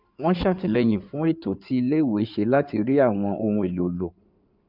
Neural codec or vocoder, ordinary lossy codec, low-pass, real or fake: codec, 16 kHz in and 24 kHz out, 2.2 kbps, FireRedTTS-2 codec; none; 5.4 kHz; fake